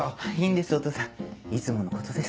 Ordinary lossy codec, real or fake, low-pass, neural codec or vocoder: none; real; none; none